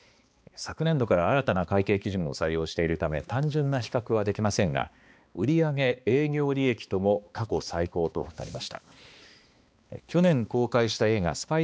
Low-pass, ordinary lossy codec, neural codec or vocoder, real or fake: none; none; codec, 16 kHz, 2 kbps, X-Codec, HuBERT features, trained on balanced general audio; fake